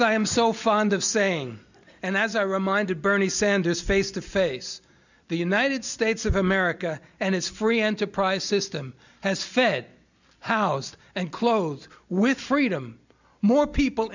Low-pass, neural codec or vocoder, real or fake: 7.2 kHz; none; real